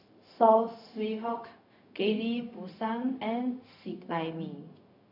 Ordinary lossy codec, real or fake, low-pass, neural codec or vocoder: none; fake; 5.4 kHz; codec, 16 kHz, 0.4 kbps, LongCat-Audio-Codec